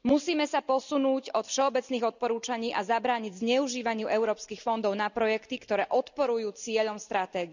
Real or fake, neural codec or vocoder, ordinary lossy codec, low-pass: real; none; none; 7.2 kHz